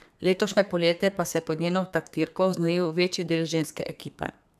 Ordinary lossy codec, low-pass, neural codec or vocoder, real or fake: none; 14.4 kHz; codec, 32 kHz, 1.9 kbps, SNAC; fake